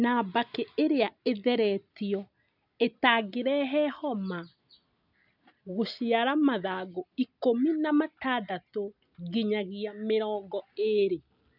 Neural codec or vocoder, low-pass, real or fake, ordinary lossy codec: none; 5.4 kHz; real; none